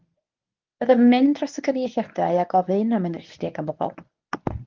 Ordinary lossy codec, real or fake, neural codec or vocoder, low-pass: Opus, 32 kbps; fake; codec, 24 kHz, 0.9 kbps, WavTokenizer, medium speech release version 1; 7.2 kHz